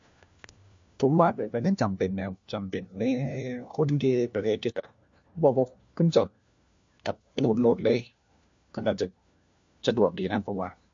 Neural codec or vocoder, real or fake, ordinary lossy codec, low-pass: codec, 16 kHz, 1 kbps, FunCodec, trained on LibriTTS, 50 frames a second; fake; MP3, 48 kbps; 7.2 kHz